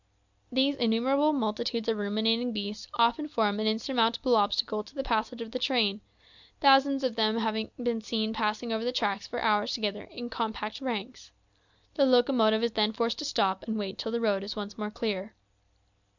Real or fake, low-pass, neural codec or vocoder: real; 7.2 kHz; none